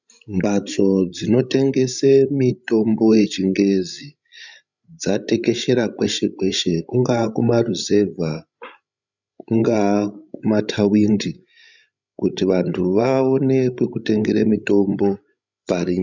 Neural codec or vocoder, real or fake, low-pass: codec, 16 kHz, 16 kbps, FreqCodec, larger model; fake; 7.2 kHz